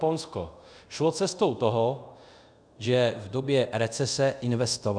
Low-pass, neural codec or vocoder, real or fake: 9.9 kHz; codec, 24 kHz, 0.5 kbps, DualCodec; fake